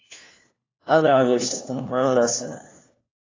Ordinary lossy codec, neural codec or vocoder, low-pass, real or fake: AAC, 32 kbps; codec, 16 kHz, 1 kbps, FunCodec, trained on LibriTTS, 50 frames a second; 7.2 kHz; fake